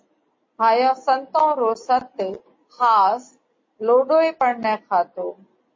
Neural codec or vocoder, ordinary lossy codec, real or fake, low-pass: none; MP3, 32 kbps; real; 7.2 kHz